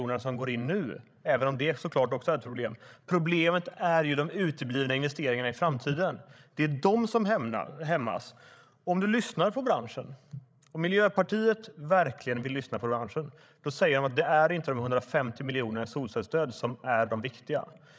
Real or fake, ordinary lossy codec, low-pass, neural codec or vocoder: fake; none; none; codec, 16 kHz, 16 kbps, FreqCodec, larger model